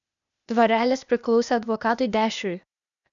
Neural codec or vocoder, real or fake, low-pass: codec, 16 kHz, 0.8 kbps, ZipCodec; fake; 7.2 kHz